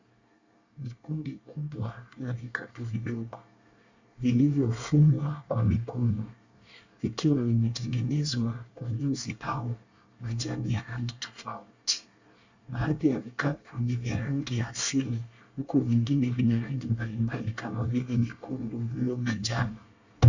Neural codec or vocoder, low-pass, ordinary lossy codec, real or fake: codec, 24 kHz, 1 kbps, SNAC; 7.2 kHz; Opus, 64 kbps; fake